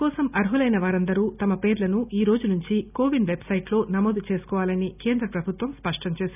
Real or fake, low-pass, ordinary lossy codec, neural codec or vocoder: real; 3.6 kHz; none; none